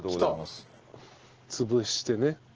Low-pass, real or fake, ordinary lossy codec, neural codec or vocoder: 7.2 kHz; real; Opus, 16 kbps; none